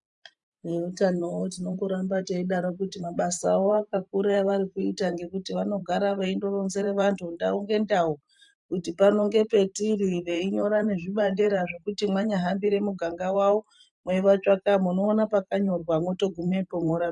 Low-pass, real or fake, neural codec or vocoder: 10.8 kHz; fake; vocoder, 44.1 kHz, 128 mel bands every 512 samples, BigVGAN v2